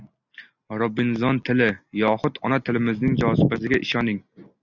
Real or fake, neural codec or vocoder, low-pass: real; none; 7.2 kHz